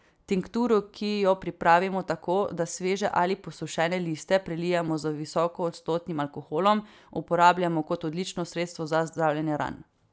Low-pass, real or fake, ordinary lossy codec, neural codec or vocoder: none; real; none; none